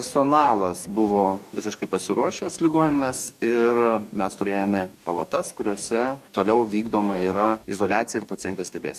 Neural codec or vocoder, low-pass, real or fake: codec, 44.1 kHz, 2.6 kbps, DAC; 14.4 kHz; fake